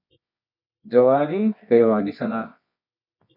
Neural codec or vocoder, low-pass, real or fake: codec, 24 kHz, 0.9 kbps, WavTokenizer, medium music audio release; 5.4 kHz; fake